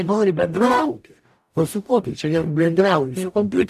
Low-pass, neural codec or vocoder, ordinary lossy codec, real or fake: 14.4 kHz; codec, 44.1 kHz, 0.9 kbps, DAC; AAC, 96 kbps; fake